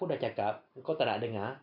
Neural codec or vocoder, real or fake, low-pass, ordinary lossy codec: none; real; 5.4 kHz; none